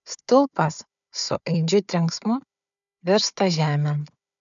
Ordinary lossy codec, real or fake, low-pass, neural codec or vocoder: MP3, 96 kbps; fake; 7.2 kHz; codec, 16 kHz, 4 kbps, FunCodec, trained on Chinese and English, 50 frames a second